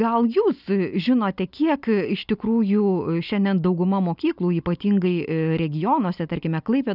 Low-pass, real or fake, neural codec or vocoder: 5.4 kHz; real; none